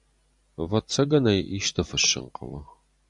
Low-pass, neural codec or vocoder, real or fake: 10.8 kHz; none; real